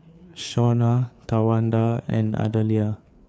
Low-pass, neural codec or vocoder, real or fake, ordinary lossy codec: none; codec, 16 kHz, 4 kbps, FreqCodec, larger model; fake; none